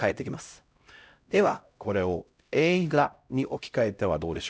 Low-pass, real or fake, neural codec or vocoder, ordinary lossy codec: none; fake; codec, 16 kHz, 0.5 kbps, X-Codec, HuBERT features, trained on LibriSpeech; none